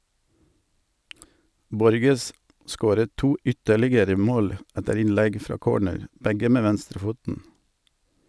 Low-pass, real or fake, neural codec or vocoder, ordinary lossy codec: none; real; none; none